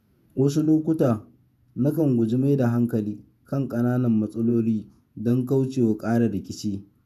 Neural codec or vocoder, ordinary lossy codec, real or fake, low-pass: vocoder, 48 kHz, 128 mel bands, Vocos; none; fake; 14.4 kHz